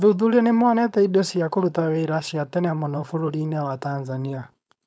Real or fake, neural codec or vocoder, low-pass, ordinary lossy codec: fake; codec, 16 kHz, 4.8 kbps, FACodec; none; none